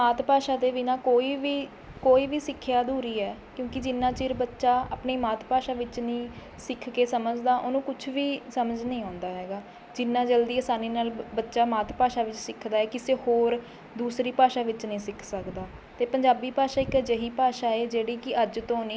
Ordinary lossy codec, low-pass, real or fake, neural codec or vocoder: none; none; real; none